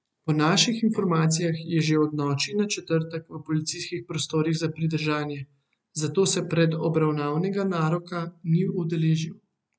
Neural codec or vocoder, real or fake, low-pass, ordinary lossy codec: none; real; none; none